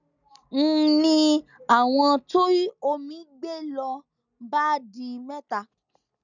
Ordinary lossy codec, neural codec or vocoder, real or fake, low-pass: none; none; real; 7.2 kHz